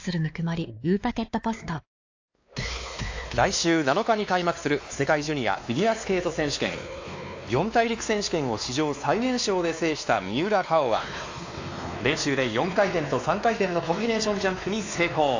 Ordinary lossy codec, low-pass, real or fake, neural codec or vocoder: AAC, 48 kbps; 7.2 kHz; fake; codec, 16 kHz, 2 kbps, X-Codec, WavLM features, trained on Multilingual LibriSpeech